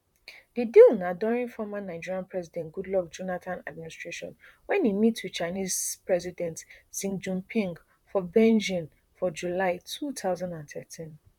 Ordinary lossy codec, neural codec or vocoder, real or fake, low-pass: none; vocoder, 44.1 kHz, 128 mel bands, Pupu-Vocoder; fake; 19.8 kHz